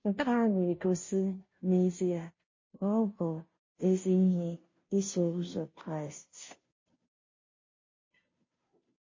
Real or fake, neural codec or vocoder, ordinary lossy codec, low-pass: fake; codec, 16 kHz, 0.5 kbps, FunCodec, trained on Chinese and English, 25 frames a second; MP3, 32 kbps; 7.2 kHz